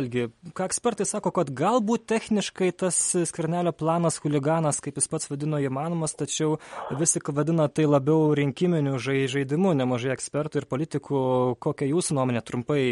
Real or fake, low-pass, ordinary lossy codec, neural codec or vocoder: real; 19.8 kHz; MP3, 48 kbps; none